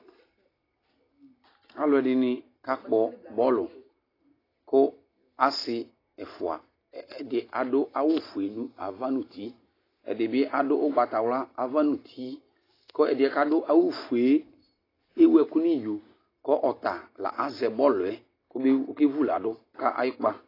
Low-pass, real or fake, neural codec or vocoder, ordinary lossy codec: 5.4 kHz; real; none; AAC, 24 kbps